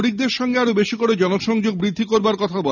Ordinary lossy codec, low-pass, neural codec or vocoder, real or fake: none; 7.2 kHz; none; real